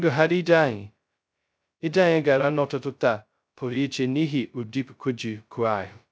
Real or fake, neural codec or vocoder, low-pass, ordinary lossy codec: fake; codec, 16 kHz, 0.2 kbps, FocalCodec; none; none